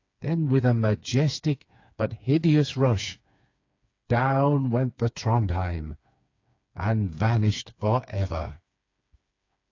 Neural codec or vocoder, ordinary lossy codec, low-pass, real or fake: codec, 16 kHz, 4 kbps, FreqCodec, smaller model; AAC, 48 kbps; 7.2 kHz; fake